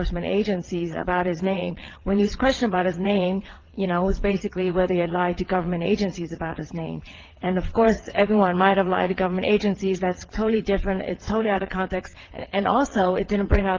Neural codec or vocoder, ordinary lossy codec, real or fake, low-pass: vocoder, 22.05 kHz, 80 mel bands, WaveNeXt; Opus, 16 kbps; fake; 7.2 kHz